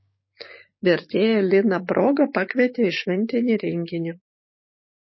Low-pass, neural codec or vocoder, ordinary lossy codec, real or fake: 7.2 kHz; codec, 16 kHz, 6 kbps, DAC; MP3, 24 kbps; fake